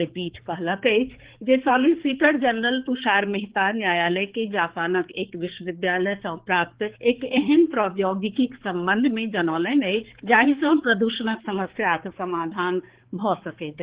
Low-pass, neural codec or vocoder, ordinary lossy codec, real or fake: 3.6 kHz; codec, 16 kHz, 4 kbps, X-Codec, HuBERT features, trained on balanced general audio; Opus, 16 kbps; fake